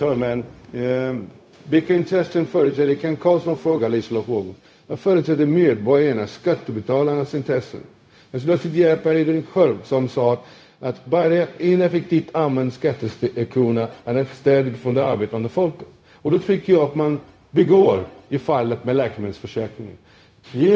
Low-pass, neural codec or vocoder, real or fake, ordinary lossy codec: none; codec, 16 kHz, 0.4 kbps, LongCat-Audio-Codec; fake; none